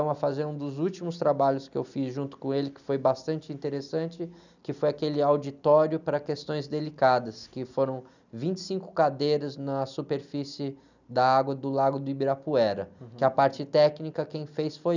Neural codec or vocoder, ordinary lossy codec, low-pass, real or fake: none; none; 7.2 kHz; real